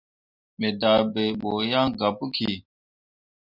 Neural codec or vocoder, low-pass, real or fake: none; 5.4 kHz; real